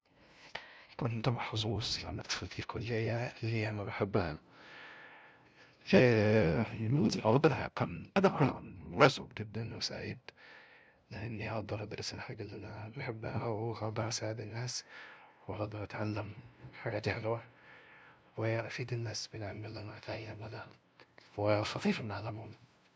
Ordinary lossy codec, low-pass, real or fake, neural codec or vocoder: none; none; fake; codec, 16 kHz, 0.5 kbps, FunCodec, trained on LibriTTS, 25 frames a second